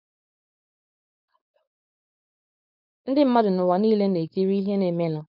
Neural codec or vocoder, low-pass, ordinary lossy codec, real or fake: codec, 16 kHz, 4.8 kbps, FACodec; 5.4 kHz; none; fake